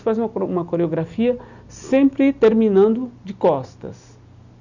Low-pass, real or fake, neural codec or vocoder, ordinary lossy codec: 7.2 kHz; real; none; none